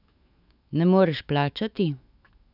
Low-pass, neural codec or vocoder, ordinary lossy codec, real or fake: 5.4 kHz; autoencoder, 48 kHz, 128 numbers a frame, DAC-VAE, trained on Japanese speech; none; fake